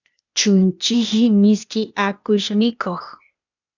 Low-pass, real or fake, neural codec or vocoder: 7.2 kHz; fake; codec, 16 kHz, 0.8 kbps, ZipCodec